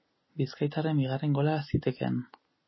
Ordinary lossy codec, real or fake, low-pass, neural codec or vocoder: MP3, 24 kbps; real; 7.2 kHz; none